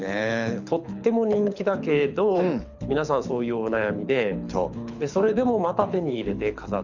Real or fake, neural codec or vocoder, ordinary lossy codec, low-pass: fake; codec, 24 kHz, 6 kbps, HILCodec; none; 7.2 kHz